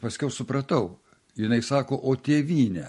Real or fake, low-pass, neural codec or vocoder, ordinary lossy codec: real; 14.4 kHz; none; MP3, 48 kbps